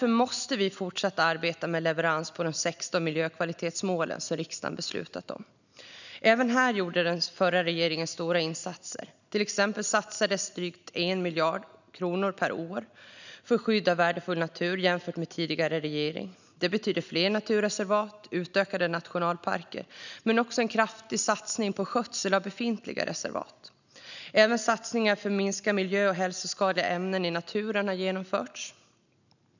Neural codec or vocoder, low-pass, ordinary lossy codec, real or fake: none; 7.2 kHz; none; real